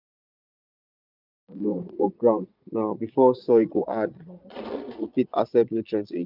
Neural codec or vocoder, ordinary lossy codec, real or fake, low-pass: vocoder, 22.05 kHz, 80 mel bands, Vocos; none; fake; 5.4 kHz